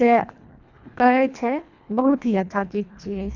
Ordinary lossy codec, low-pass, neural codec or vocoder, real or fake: none; 7.2 kHz; codec, 24 kHz, 1.5 kbps, HILCodec; fake